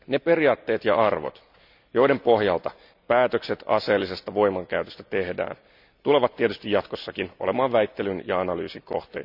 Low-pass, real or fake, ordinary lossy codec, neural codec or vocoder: 5.4 kHz; real; none; none